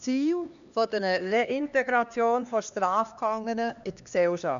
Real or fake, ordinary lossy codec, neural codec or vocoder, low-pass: fake; none; codec, 16 kHz, 2 kbps, X-Codec, HuBERT features, trained on LibriSpeech; 7.2 kHz